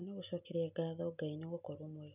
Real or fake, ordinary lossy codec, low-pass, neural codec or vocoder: real; none; 3.6 kHz; none